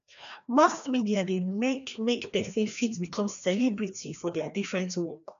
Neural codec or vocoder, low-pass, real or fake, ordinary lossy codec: codec, 16 kHz, 1 kbps, FreqCodec, larger model; 7.2 kHz; fake; none